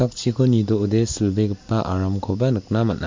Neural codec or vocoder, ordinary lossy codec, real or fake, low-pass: none; MP3, 48 kbps; real; 7.2 kHz